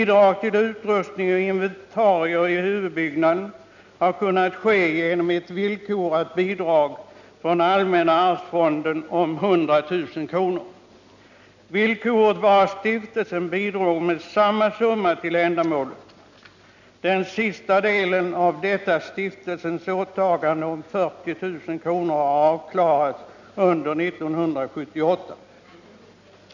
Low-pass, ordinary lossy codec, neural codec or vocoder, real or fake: 7.2 kHz; none; none; real